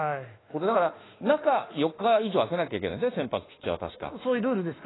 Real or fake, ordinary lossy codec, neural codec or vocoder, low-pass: fake; AAC, 16 kbps; autoencoder, 48 kHz, 32 numbers a frame, DAC-VAE, trained on Japanese speech; 7.2 kHz